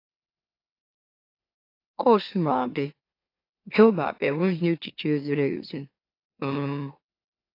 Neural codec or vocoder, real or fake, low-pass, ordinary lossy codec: autoencoder, 44.1 kHz, a latent of 192 numbers a frame, MeloTTS; fake; 5.4 kHz; AAC, 32 kbps